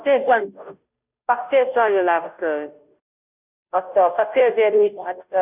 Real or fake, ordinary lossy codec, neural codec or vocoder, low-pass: fake; none; codec, 16 kHz, 0.5 kbps, FunCodec, trained on Chinese and English, 25 frames a second; 3.6 kHz